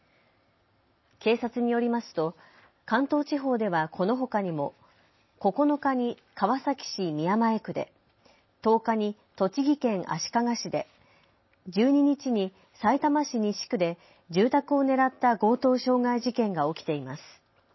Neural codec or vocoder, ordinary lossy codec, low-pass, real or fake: none; MP3, 24 kbps; 7.2 kHz; real